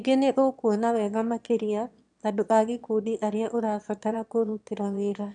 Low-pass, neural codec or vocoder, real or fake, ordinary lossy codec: 9.9 kHz; autoencoder, 22.05 kHz, a latent of 192 numbers a frame, VITS, trained on one speaker; fake; none